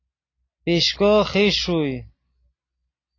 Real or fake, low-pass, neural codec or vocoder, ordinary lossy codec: real; 7.2 kHz; none; AAC, 32 kbps